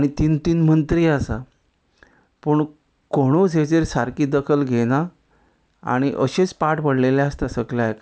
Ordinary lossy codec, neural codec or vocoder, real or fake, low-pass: none; none; real; none